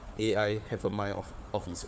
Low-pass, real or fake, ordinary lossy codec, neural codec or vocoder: none; fake; none; codec, 16 kHz, 4 kbps, FunCodec, trained on Chinese and English, 50 frames a second